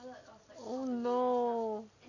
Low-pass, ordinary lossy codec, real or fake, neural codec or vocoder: 7.2 kHz; none; real; none